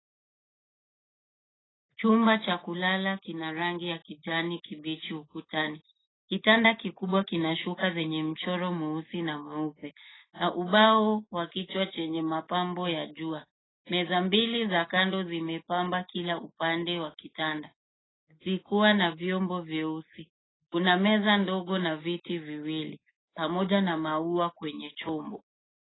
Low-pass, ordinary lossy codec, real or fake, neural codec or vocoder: 7.2 kHz; AAC, 16 kbps; real; none